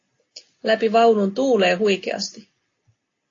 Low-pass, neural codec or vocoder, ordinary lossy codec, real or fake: 7.2 kHz; none; AAC, 32 kbps; real